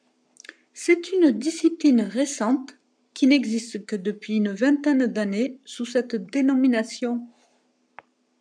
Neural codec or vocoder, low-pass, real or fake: codec, 44.1 kHz, 7.8 kbps, Pupu-Codec; 9.9 kHz; fake